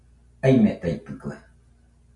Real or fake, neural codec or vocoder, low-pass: real; none; 10.8 kHz